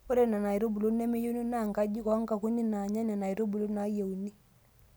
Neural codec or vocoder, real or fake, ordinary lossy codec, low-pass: none; real; none; none